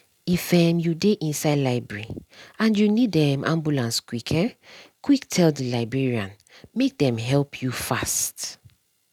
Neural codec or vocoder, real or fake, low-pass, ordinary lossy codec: none; real; 19.8 kHz; none